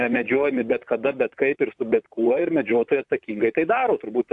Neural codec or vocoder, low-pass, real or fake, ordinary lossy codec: vocoder, 44.1 kHz, 128 mel bands, Pupu-Vocoder; 10.8 kHz; fake; AAC, 64 kbps